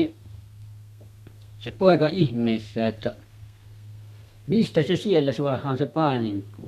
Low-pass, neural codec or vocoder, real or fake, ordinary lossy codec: 14.4 kHz; codec, 32 kHz, 1.9 kbps, SNAC; fake; none